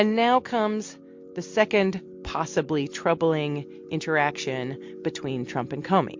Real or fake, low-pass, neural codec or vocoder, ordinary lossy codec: real; 7.2 kHz; none; MP3, 48 kbps